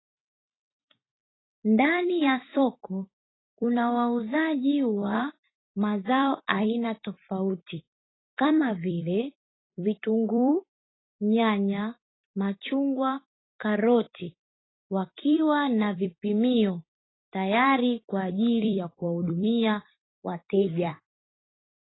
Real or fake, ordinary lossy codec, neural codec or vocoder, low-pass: fake; AAC, 16 kbps; vocoder, 44.1 kHz, 128 mel bands every 256 samples, BigVGAN v2; 7.2 kHz